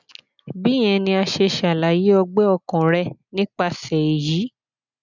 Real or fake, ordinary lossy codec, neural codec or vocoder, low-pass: real; none; none; 7.2 kHz